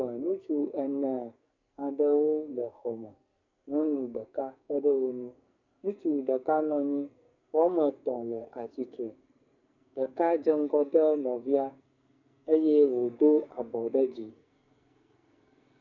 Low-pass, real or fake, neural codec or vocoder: 7.2 kHz; fake; codec, 44.1 kHz, 2.6 kbps, SNAC